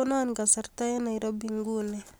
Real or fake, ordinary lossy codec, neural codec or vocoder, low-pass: real; none; none; none